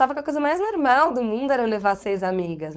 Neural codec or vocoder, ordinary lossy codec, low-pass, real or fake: codec, 16 kHz, 4.8 kbps, FACodec; none; none; fake